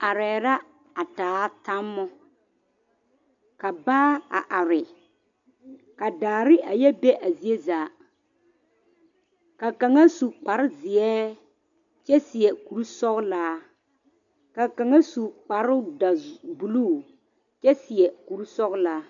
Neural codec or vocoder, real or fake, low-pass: none; real; 7.2 kHz